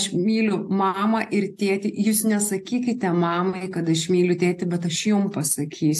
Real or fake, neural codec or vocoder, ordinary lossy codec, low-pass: real; none; AAC, 64 kbps; 14.4 kHz